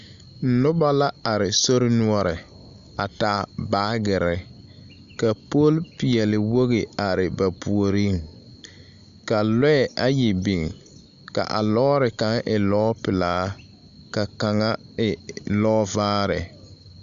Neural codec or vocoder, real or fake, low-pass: none; real; 7.2 kHz